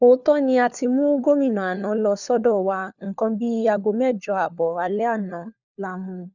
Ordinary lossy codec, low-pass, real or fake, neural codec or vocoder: none; 7.2 kHz; fake; codec, 16 kHz, 4 kbps, FunCodec, trained on LibriTTS, 50 frames a second